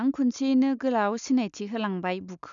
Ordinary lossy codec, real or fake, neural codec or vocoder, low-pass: none; real; none; 7.2 kHz